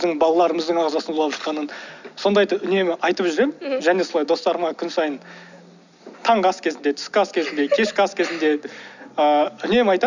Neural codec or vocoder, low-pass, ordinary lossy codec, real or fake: none; 7.2 kHz; none; real